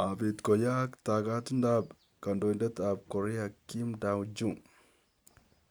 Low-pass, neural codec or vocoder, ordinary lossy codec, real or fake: none; none; none; real